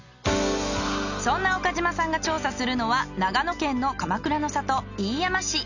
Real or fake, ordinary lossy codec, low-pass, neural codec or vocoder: real; none; 7.2 kHz; none